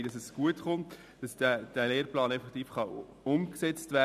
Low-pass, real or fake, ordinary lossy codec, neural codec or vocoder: 14.4 kHz; real; none; none